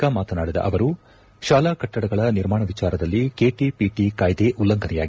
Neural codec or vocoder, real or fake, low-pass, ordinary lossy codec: none; real; none; none